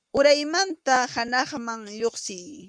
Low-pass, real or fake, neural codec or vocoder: 9.9 kHz; fake; codec, 44.1 kHz, 7.8 kbps, Pupu-Codec